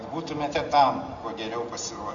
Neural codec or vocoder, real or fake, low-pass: none; real; 7.2 kHz